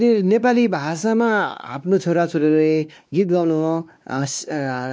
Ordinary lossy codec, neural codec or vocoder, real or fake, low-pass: none; codec, 16 kHz, 2 kbps, X-Codec, WavLM features, trained on Multilingual LibriSpeech; fake; none